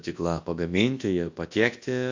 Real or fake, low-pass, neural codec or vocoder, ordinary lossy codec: fake; 7.2 kHz; codec, 24 kHz, 0.9 kbps, WavTokenizer, large speech release; AAC, 48 kbps